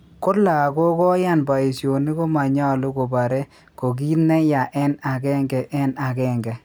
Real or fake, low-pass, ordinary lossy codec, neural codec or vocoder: real; none; none; none